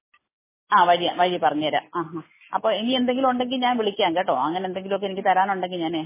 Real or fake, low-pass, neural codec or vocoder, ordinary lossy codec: real; 3.6 kHz; none; MP3, 16 kbps